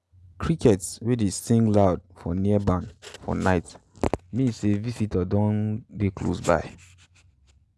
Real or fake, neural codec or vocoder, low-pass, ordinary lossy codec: real; none; none; none